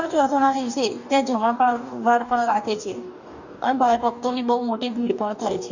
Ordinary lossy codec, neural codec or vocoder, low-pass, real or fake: none; codec, 44.1 kHz, 2.6 kbps, DAC; 7.2 kHz; fake